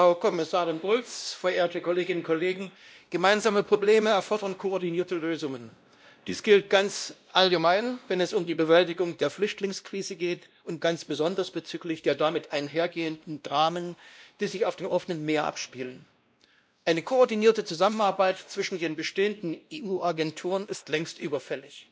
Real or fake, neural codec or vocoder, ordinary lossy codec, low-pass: fake; codec, 16 kHz, 1 kbps, X-Codec, WavLM features, trained on Multilingual LibriSpeech; none; none